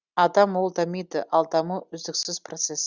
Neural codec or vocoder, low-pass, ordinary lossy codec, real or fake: none; 7.2 kHz; Opus, 64 kbps; real